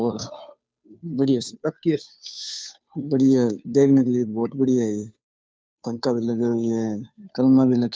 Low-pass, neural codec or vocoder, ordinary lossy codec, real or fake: none; codec, 16 kHz, 2 kbps, FunCodec, trained on Chinese and English, 25 frames a second; none; fake